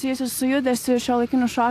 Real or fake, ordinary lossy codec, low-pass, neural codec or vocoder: real; AAC, 64 kbps; 14.4 kHz; none